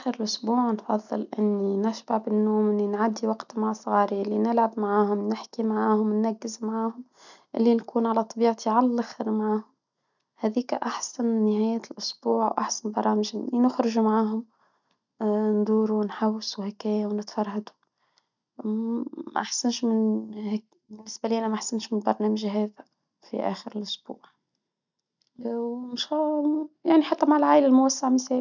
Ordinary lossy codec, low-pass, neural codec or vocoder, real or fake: none; 7.2 kHz; none; real